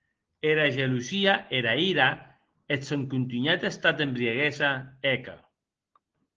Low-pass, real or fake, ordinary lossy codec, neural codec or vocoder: 7.2 kHz; real; Opus, 16 kbps; none